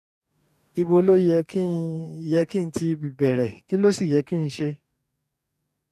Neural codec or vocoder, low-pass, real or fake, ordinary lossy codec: codec, 44.1 kHz, 2.6 kbps, DAC; 14.4 kHz; fake; none